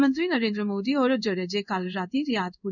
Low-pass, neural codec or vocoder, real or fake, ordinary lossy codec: 7.2 kHz; codec, 16 kHz in and 24 kHz out, 1 kbps, XY-Tokenizer; fake; none